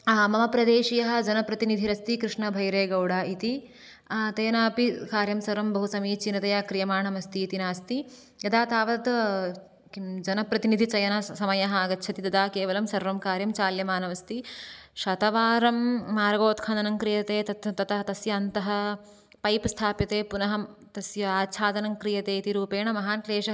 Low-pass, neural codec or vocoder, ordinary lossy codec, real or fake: none; none; none; real